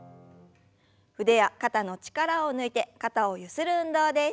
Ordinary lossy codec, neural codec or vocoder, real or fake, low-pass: none; none; real; none